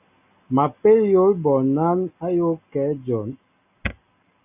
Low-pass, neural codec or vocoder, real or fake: 3.6 kHz; none; real